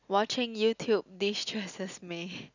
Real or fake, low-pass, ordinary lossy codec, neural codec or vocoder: real; 7.2 kHz; none; none